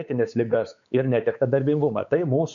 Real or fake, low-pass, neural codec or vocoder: fake; 7.2 kHz; codec, 16 kHz, 4 kbps, X-Codec, WavLM features, trained on Multilingual LibriSpeech